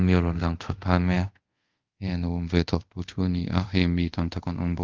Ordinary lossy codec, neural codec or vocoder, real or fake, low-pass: Opus, 32 kbps; codec, 24 kHz, 0.5 kbps, DualCodec; fake; 7.2 kHz